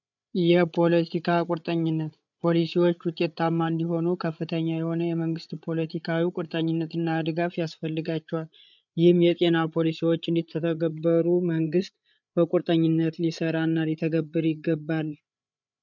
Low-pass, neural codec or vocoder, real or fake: 7.2 kHz; codec, 16 kHz, 8 kbps, FreqCodec, larger model; fake